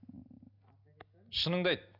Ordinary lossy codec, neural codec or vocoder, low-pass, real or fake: none; none; 5.4 kHz; real